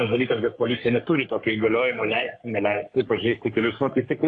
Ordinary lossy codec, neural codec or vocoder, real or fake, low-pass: AAC, 48 kbps; codec, 44.1 kHz, 3.4 kbps, Pupu-Codec; fake; 9.9 kHz